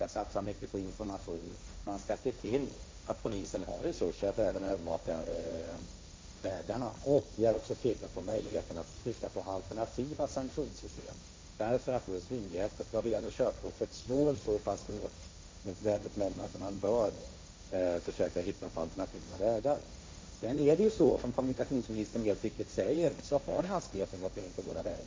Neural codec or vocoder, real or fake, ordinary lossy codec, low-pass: codec, 16 kHz, 1.1 kbps, Voila-Tokenizer; fake; none; none